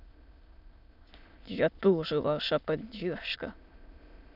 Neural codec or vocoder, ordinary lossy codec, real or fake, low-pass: autoencoder, 22.05 kHz, a latent of 192 numbers a frame, VITS, trained on many speakers; none; fake; 5.4 kHz